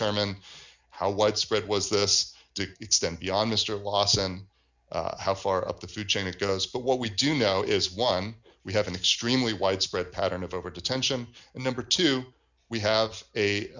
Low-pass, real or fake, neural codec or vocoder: 7.2 kHz; real; none